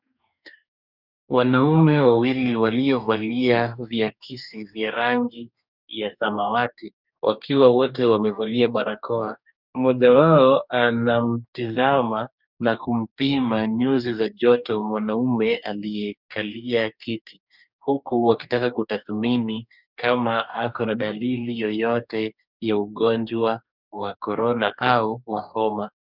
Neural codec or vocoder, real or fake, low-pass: codec, 44.1 kHz, 2.6 kbps, DAC; fake; 5.4 kHz